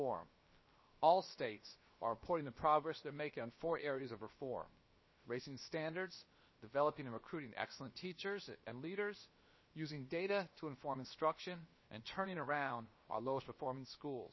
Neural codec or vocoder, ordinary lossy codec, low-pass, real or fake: codec, 16 kHz, about 1 kbps, DyCAST, with the encoder's durations; MP3, 24 kbps; 7.2 kHz; fake